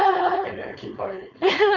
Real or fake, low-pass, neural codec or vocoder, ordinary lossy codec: fake; 7.2 kHz; codec, 16 kHz, 4.8 kbps, FACodec; none